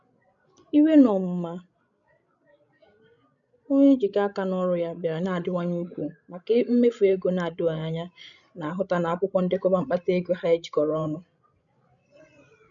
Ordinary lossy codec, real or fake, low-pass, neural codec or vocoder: none; fake; 7.2 kHz; codec, 16 kHz, 16 kbps, FreqCodec, larger model